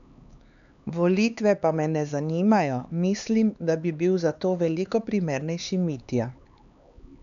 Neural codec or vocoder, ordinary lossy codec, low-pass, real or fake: codec, 16 kHz, 4 kbps, X-Codec, HuBERT features, trained on LibriSpeech; none; 7.2 kHz; fake